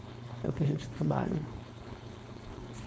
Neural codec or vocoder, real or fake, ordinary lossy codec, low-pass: codec, 16 kHz, 4.8 kbps, FACodec; fake; none; none